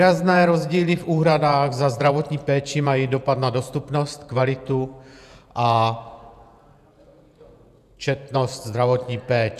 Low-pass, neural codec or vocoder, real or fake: 14.4 kHz; vocoder, 48 kHz, 128 mel bands, Vocos; fake